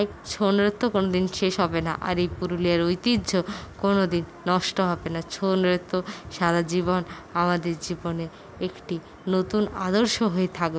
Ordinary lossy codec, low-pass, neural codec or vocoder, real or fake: none; none; none; real